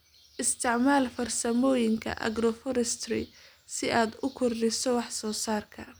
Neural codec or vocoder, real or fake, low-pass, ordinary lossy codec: none; real; none; none